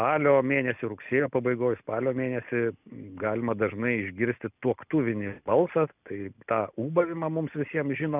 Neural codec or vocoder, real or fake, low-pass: none; real; 3.6 kHz